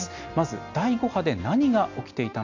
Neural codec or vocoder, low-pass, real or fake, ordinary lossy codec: none; 7.2 kHz; real; MP3, 48 kbps